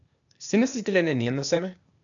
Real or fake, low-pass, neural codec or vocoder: fake; 7.2 kHz; codec, 16 kHz, 0.8 kbps, ZipCodec